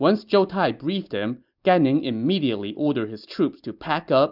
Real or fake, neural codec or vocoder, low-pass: real; none; 5.4 kHz